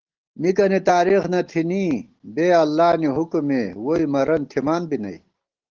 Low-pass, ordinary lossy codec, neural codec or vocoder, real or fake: 7.2 kHz; Opus, 16 kbps; none; real